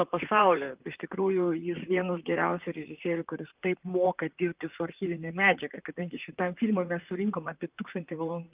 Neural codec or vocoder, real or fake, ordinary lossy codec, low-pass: vocoder, 44.1 kHz, 128 mel bands, Pupu-Vocoder; fake; Opus, 24 kbps; 3.6 kHz